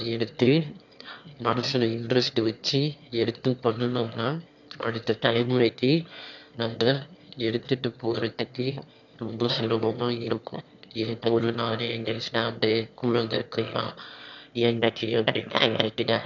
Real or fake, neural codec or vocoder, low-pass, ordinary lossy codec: fake; autoencoder, 22.05 kHz, a latent of 192 numbers a frame, VITS, trained on one speaker; 7.2 kHz; none